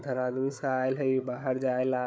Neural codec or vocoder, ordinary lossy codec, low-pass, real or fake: codec, 16 kHz, 16 kbps, FunCodec, trained on Chinese and English, 50 frames a second; none; none; fake